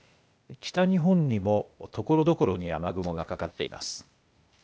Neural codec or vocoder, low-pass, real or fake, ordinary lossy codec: codec, 16 kHz, 0.8 kbps, ZipCodec; none; fake; none